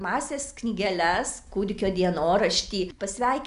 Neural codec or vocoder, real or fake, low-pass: none; real; 10.8 kHz